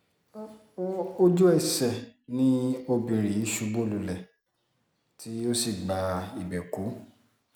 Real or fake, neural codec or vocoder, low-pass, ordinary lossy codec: real; none; none; none